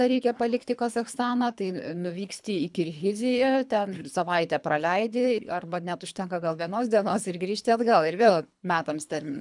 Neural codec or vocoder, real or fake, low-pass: codec, 24 kHz, 3 kbps, HILCodec; fake; 10.8 kHz